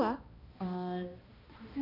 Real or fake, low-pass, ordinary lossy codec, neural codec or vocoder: fake; 5.4 kHz; none; codec, 16 kHz, 1 kbps, X-Codec, HuBERT features, trained on balanced general audio